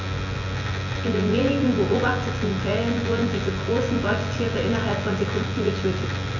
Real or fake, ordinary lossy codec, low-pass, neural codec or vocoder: fake; none; 7.2 kHz; vocoder, 24 kHz, 100 mel bands, Vocos